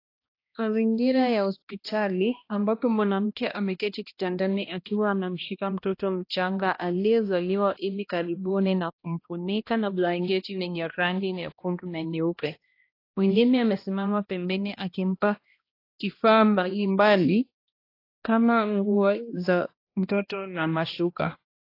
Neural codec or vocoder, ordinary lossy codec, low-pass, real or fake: codec, 16 kHz, 1 kbps, X-Codec, HuBERT features, trained on balanced general audio; AAC, 32 kbps; 5.4 kHz; fake